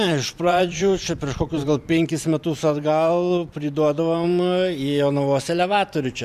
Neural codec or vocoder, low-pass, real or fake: vocoder, 44.1 kHz, 128 mel bands every 512 samples, BigVGAN v2; 14.4 kHz; fake